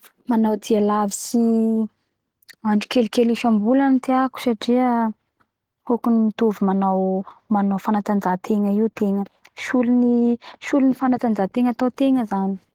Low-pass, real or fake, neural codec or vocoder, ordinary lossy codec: 19.8 kHz; real; none; Opus, 16 kbps